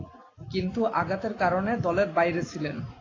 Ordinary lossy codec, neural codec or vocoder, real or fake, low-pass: AAC, 32 kbps; none; real; 7.2 kHz